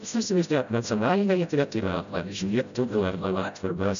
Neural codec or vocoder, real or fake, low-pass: codec, 16 kHz, 0.5 kbps, FreqCodec, smaller model; fake; 7.2 kHz